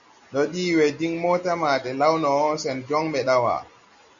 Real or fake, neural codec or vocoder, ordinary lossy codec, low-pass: real; none; MP3, 96 kbps; 7.2 kHz